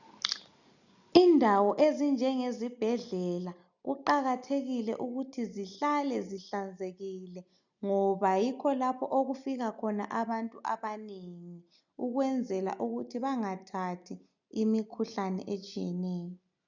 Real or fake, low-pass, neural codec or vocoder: real; 7.2 kHz; none